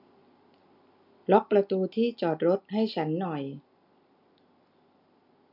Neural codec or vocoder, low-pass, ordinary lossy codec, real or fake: none; 5.4 kHz; none; real